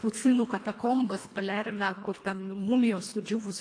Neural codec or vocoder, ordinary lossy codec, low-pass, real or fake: codec, 24 kHz, 1.5 kbps, HILCodec; AAC, 48 kbps; 9.9 kHz; fake